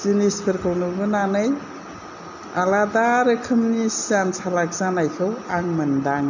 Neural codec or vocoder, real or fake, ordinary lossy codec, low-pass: none; real; none; 7.2 kHz